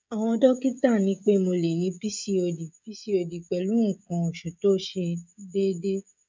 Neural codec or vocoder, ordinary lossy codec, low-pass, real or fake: codec, 16 kHz, 16 kbps, FreqCodec, smaller model; none; none; fake